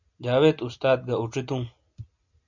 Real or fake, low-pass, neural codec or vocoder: real; 7.2 kHz; none